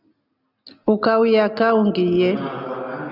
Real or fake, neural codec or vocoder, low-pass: real; none; 5.4 kHz